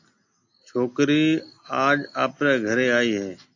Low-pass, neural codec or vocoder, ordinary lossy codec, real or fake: 7.2 kHz; none; AAC, 48 kbps; real